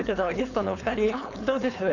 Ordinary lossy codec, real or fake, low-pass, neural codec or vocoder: none; fake; 7.2 kHz; codec, 16 kHz, 4.8 kbps, FACodec